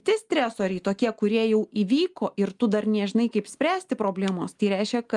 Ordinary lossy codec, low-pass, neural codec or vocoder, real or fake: Opus, 32 kbps; 10.8 kHz; none; real